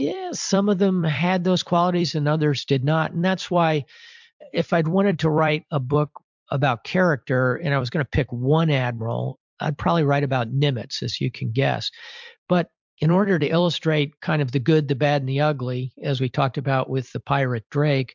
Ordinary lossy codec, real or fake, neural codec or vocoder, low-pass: MP3, 64 kbps; fake; vocoder, 44.1 kHz, 128 mel bands every 256 samples, BigVGAN v2; 7.2 kHz